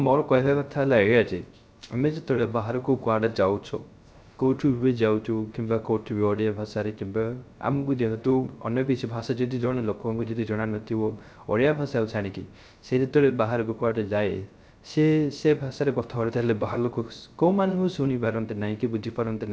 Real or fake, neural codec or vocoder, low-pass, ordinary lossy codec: fake; codec, 16 kHz, 0.3 kbps, FocalCodec; none; none